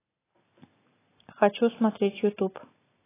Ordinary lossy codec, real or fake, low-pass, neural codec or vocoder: AAC, 16 kbps; real; 3.6 kHz; none